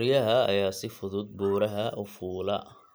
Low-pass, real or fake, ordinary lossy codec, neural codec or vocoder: none; real; none; none